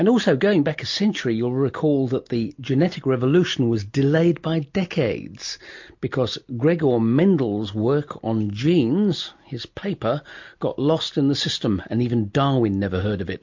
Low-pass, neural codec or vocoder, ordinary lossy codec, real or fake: 7.2 kHz; none; MP3, 48 kbps; real